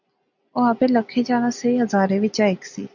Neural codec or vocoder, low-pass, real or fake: none; 7.2 kHz; real